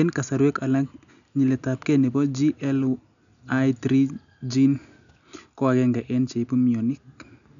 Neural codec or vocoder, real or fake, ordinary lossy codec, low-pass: none; real; none; 7.2 kHz